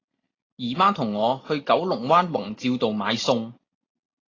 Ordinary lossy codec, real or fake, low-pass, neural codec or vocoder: AAC, 32 kbps; real; 7.2 kHz; none